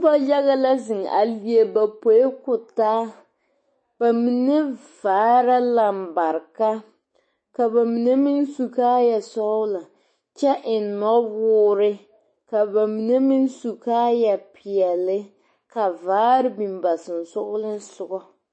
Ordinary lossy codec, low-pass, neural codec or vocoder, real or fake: MP3, 32 kbps; 9.9 kHz; autoencoder, 48 kHz, 128 numbers a frame, DAC-VAE, trained on Japanese speech; fake